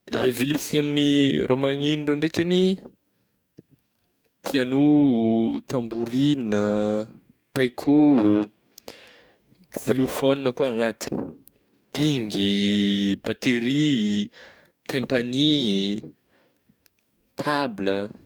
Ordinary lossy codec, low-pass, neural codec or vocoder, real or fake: none; none; codec, 44.1 kHz, 2.6 kbps, DAC; fake